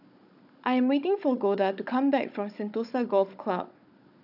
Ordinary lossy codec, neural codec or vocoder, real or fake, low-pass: none; codec, 16 kHz, 16 kbps, FunCodec, trained on Chinese and English, 50 frames a second; fake; 5.4 kHz